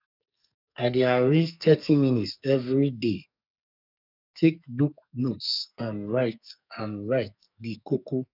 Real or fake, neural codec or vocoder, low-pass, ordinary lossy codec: fake; codec, 44.1 kHz, 2.6 kbps, SNAC; 5.4 kHz; none